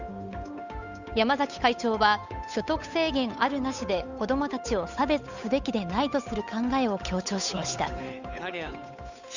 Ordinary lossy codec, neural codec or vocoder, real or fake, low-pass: none; codec, 16 kHz, 8 kbps, FunCodec, trained on Chinese and English, 25 frames a second; fake; 7.2 kHz